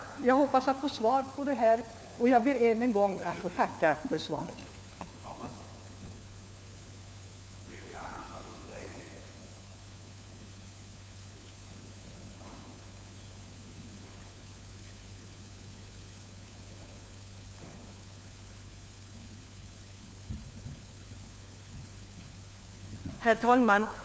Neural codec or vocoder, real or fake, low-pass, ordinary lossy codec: codec, 16 kHz, 4 kbps, FunCodec, trained on LibriTTS, 50 frames a second; fake; none; none